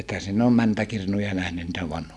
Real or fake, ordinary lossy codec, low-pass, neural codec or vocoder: real; none; none; none